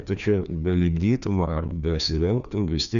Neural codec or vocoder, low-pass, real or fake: codec, 16 kHz, 2 kbps, FreqCodec, larger model; 7.2 kHz; fake